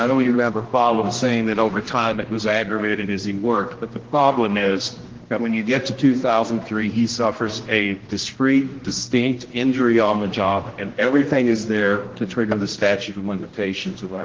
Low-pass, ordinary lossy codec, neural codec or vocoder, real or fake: 7.2 kHz; Opus, 16 kbps; codec, 16 kHz, 1 kbps, X-Codec, HuBERT features, trained on general audio; fake